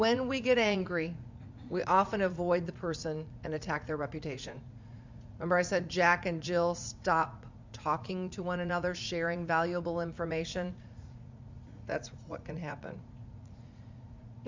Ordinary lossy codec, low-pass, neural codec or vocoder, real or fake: MP3, 64 kbps; 7.2 kHz; vocoder, 44.1 kHz, 80 mel bands, Vocos; fake